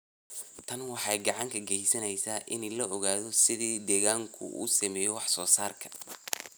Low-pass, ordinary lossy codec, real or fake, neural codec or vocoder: none; none; real; none